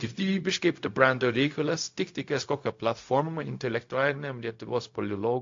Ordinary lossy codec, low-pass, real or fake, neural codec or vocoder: AAC, 48 kbps; 7.2 kHz; fake; codec, 16 kHz, 0.4 kbps, LongCat-Audio-Codec